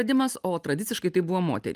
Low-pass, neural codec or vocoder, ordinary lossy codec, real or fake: 14.4 kHz; none; Opus, 32 kbps; real